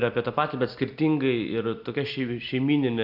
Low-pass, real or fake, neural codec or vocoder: 5.4 kHz; real; none